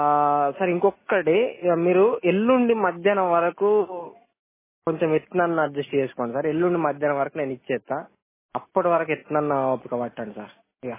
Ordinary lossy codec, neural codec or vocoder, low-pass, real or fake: MP3, 16 kbps; none; 3.6 kHz; real